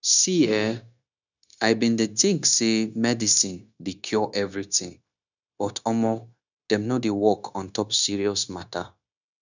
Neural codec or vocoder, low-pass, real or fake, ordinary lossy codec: codec, 16 kHz, 0.9 kbps, LongCat-Audio-Codec; 7.2 kHz; fake; none